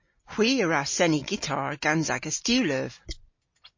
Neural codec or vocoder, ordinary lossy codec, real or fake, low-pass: none; MP3, 32 kbps; real; 7.2 kHz